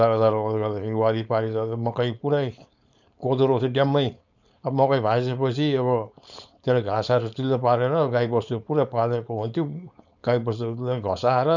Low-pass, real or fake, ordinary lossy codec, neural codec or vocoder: 7.2 kHz; fake; none; codec, 16 kHz, 4.8 kbps, FACodec